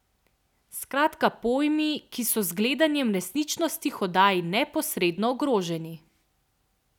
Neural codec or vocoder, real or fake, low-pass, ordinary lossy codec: none; real; 19.8 kHz; none